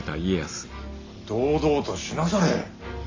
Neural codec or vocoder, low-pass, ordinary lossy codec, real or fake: none; 7.2 kHz; AAC, 32 kbps; real